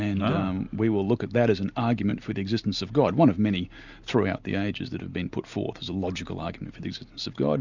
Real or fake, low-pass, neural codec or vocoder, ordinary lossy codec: real; 7.2 kHz; none; Opus, 64 kbps